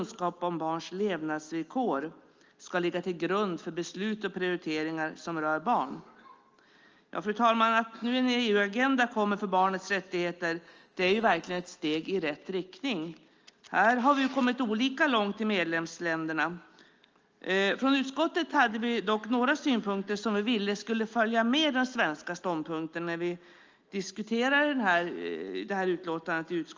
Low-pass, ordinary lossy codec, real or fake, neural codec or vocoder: 7.2 kHz; Opus, 24 kbps; real; none